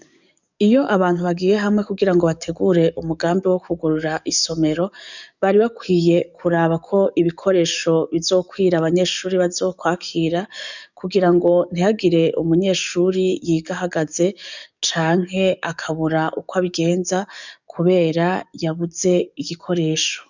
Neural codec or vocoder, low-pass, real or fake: codec, 44.1 kHz, 7.8 kbps, DAC; 7.2 kHz; fake